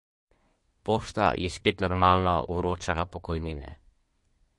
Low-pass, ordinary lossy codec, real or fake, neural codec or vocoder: 10.8 kHz; MP3, 48 kbps; fake; codec, 24 kHz, 1 kbps, SNAC